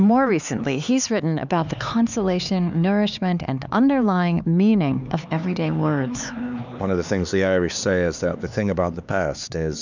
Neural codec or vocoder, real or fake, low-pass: codec, 16 kHz, 4 kbps, X-Codec, HuBERT features, trained on LibriSpeech; fake; 7.2 kHz